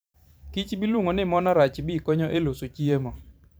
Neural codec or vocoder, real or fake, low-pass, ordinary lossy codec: vocoder, 44.1 kHz, 128 mel bands every 512 samples, BigVGAN v2; fake; none; none